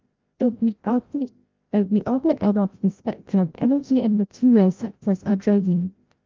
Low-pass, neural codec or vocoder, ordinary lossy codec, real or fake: 7.2 kHz; codec, 16 kHz, 0.5 kbps, FreqCodec, larger model; Opus, 24 kbps; fake